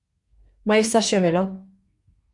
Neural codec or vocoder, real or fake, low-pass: codec, 24 kHz, 1 kbps, SNAC; fake; 10.8 kHz